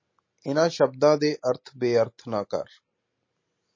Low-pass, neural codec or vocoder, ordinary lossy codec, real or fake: 7.2 kHz; none; MP3, 32 kbps; real